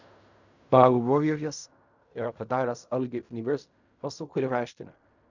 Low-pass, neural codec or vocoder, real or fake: 7.2 kHz; codec, 16 kHz in and 24 kHz out, 0.4 kbps, LongCat-Audio-Codec, fine tuned four codebook decoder; fake